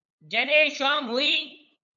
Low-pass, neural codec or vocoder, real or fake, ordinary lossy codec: 7.2 kHz; codec, 16 kHz, 8 kbps, FunCodec, trained on LibriTTS, 25 frames a second; fake; MP3, 96 kbps